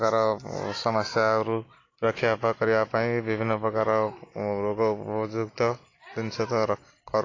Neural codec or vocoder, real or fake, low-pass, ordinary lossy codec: none; real; 7.2 kHz; AAC, 32 kbps